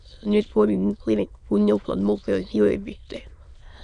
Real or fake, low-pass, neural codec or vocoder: fake; 9.9 kHz; autoencoder, 22.05 kHz, a latent of 192 numbers a frame, VITS, trained on many speakers